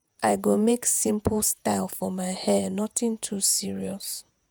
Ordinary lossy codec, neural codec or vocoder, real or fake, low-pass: none; vocoder, 48 kHz, 128 mel bands, Vocos; fake; none